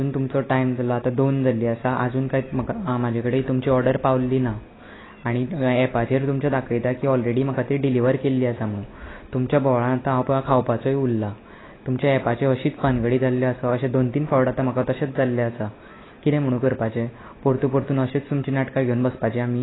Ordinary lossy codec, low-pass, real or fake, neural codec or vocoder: AAC, 16 kbps; 7.2 kHz; real; none